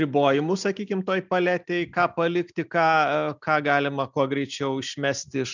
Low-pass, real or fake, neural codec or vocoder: 7.2 kHz; real; none